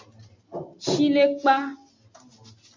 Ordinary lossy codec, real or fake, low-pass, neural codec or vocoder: MP3, 64 kbps; real; 7.2 kHz; none